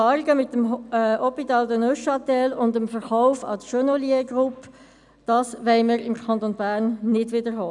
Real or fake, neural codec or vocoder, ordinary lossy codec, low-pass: real; none; none; 10.8 kHz